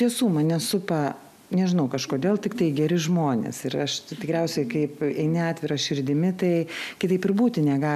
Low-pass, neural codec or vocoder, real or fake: 14.4 kHz; none; real